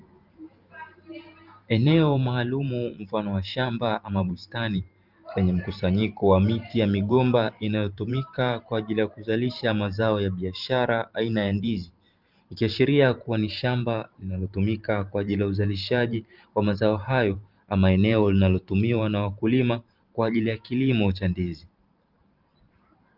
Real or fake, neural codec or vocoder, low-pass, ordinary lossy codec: fake; vocoder, 44.1 kHz, 128 mel bands every 512 samples, BigVGAN v2; 5.4 kHz; Opus, 24 kbps